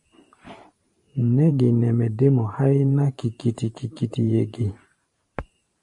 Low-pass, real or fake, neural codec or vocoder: 10.8 kHz; fake; vocoder, 44.1 kHz, 128 mel bands every 512 samples, BigVGAN v2